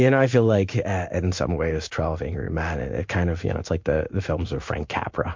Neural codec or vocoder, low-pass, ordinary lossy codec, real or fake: codec, 16 kHz in and 24 kHz out, 1 kbps, XY-Tokenizer; 7.2 kHz; MP3, 48 kbps; fake